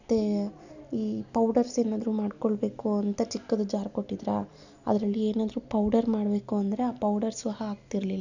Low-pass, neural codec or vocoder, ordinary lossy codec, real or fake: 7.2 kHz; none; none; real